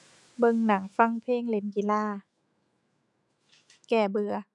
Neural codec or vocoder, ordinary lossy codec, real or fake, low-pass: autoencoder, 48 kHz, 128 numbers a frame, DAC-VAE, trained on Japanese speech; none; fake; 10.8 kHz